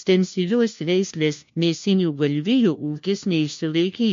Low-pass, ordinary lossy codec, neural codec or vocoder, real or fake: 7.2 kHz; MP3, 48 kbps; codec, 16 kHz, 1 kbps, FunCodec, trained on LibriTTS, 50 frames a second; fake